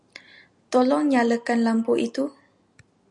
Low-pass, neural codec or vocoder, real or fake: 10.8 kHz; none; real